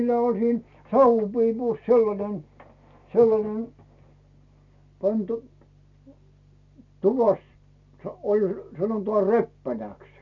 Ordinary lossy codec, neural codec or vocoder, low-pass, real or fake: none; none; 7.2 kHz; real